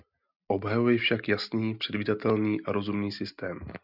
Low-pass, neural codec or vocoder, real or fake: 5.4 kHz; none; real